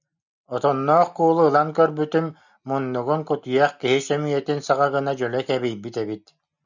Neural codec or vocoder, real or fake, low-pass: none; real; 7.2 kHz